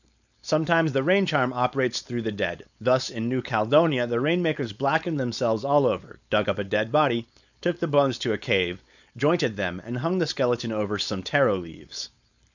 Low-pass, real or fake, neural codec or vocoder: 7.2 kHz; fake; codec, 16 kHz, 4.8 kbps, FACodec